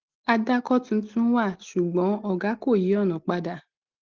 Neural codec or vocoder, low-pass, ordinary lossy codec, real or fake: none; 7.2 kHz; Opus, 16 kbps; real